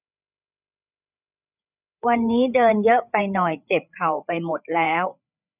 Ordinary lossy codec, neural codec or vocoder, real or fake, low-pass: none; codec, 16 kHz, 8 kbps, FreqCodec, larger model; fake; 3.6 kHz